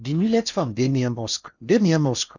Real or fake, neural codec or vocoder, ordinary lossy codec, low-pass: fake; codec, 16 kHz in and 24 kHz out, 0.6 kbps, FocalCodec, streaming, 4096 codes; none; 7.2 kHz